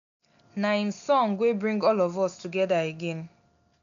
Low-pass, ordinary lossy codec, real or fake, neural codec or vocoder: 7.2 kHz; none; real; none